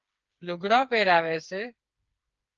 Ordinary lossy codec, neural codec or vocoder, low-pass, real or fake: Opus, 24 kbps; codec, 16 kHz, 4 kbps, FreqCodec, smaller model; 7.2 kHz; fake